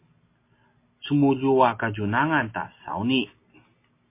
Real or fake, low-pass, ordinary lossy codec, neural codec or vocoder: real; 3.6 kHz; MP3, 24 kbps; none